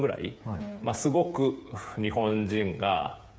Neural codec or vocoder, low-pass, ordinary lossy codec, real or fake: codec, 16 kHz, 8 kbps, FreqCodec, smaller model; none; none; fake